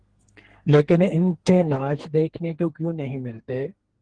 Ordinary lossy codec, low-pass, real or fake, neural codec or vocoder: Opus, 16 kbps; 9.9 kHz; fake; codec, 32 kHz, 1.9 kbps, SNAC